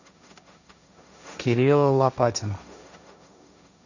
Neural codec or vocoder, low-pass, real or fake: codec, 16 kHz, 1.1 kbps, Voila-Tokenizer; 7.2 kHz; fake